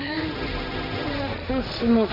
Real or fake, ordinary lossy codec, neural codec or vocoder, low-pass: fake; none; codec, 16 kHz, 1.1 kbps, Voila-Tokenizer; 5.4 kHz